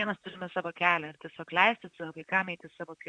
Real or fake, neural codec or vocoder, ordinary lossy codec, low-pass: fake; vocoder, 44.1 kHz, 128 mel bands, Pupu-Vocoder; Opus, 64 kbps; 9.9 kHz